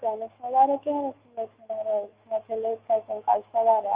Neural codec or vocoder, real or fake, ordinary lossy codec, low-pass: autoencoder, 48 kHz, 128 numbers a frame, DAC-VAE, trained on Japanese speech; fake; Opus, 24 kbps; 3.6 kHz